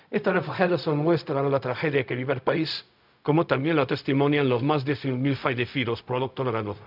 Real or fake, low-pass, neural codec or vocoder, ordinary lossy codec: fake; 5.4 kHz; codec, 16 kHz, 0.4 kbps, LongCat-Audio-Codec; none